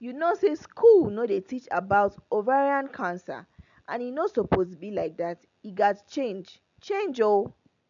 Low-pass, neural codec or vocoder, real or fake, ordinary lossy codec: 7.2 kHz; none; real; none